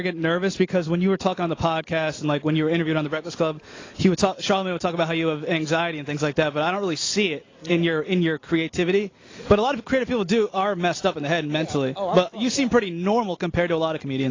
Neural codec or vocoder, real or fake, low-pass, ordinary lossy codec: none; real; 7.2 kHz; AAC, 32 kbps